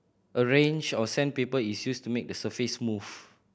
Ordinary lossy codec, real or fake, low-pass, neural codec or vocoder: none; real; none; none